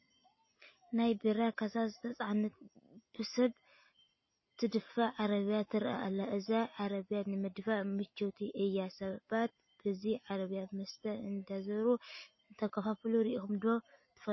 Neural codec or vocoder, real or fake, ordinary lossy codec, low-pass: none; real; MP3, 24 kbps; 7.2 kHz